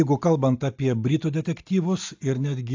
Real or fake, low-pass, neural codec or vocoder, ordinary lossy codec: real; 7.2 kHz; none; AAC, 48 kbps